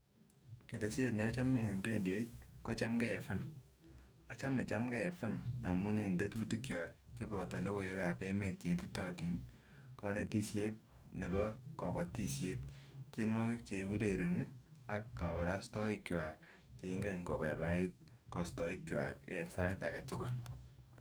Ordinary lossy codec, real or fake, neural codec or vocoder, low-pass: none; fake; codec, 44.1 kHz, 2.6 kbps, DAC; none